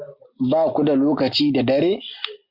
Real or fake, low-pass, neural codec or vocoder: real; 5.4 kHz; none